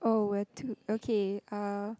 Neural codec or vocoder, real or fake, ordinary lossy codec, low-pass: none; real; none; none